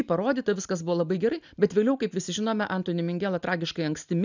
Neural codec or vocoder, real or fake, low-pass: none; real; 7.2 kHz